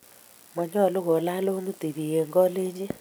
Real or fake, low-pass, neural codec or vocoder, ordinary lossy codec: real; none; none; none